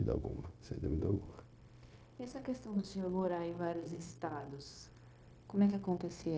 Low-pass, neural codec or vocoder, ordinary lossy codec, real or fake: none; codec, 16 kHz, 0.9 kbps, LongCat-Audio-Codec; none; fake